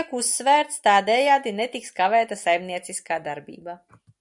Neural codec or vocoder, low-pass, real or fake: none; 10.8 kHz; real